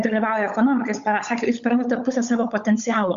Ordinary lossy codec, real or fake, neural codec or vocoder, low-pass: Opus, 64 kbps; fake; codec, 16 kHz, 16 kbps, FunCodec, trained on LibriTTS, 50 frames a second; 7.2 kHz